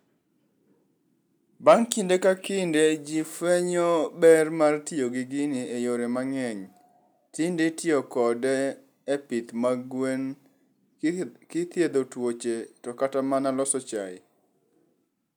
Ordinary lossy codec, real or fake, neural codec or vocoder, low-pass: none; real; none; none